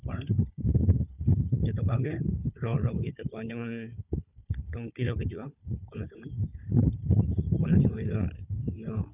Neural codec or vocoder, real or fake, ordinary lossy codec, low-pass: codec, 16 kHz, 4 kbps, FunCodec, trained on Chinese and English, 50 frames a second; fake; none; 3.6 kHz